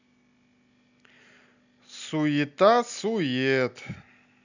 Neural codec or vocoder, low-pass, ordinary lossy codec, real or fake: none; 7.2 kHz; none; real